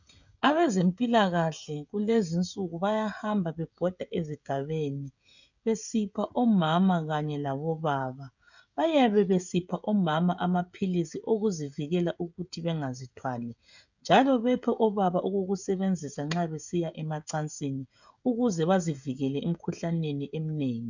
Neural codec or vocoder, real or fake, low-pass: codec, 16 kHz, 16 kbps, FreqCodec, smaller model; fake; 7.2 kHz